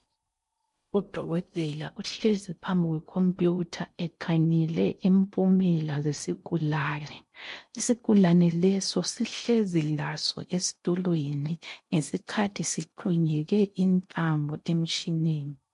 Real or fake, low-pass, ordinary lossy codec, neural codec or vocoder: fake; 10.8 kHz; MP3, 64 kbps; codec, 16 kHz in and 24 kHz out, 0.6 kbps, FocalCodec, streaming, 4096 codes